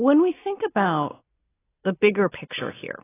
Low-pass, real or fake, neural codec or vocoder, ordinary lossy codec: 3.6 kHz; real; none; AAC, 16 kbps